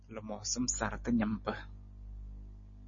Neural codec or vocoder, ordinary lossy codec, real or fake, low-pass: none; MP3, 32 kbps; real; 7.2 kHz